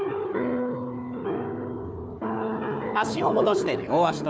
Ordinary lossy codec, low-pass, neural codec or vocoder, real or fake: none; none; codec, 16 kHz, 4 kbps, FunCodec, trained on Chinese and English, 50 frames a second; fake